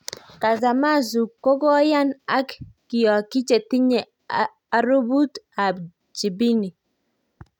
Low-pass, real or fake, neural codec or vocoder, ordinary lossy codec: 19.8 kHz; real; none; none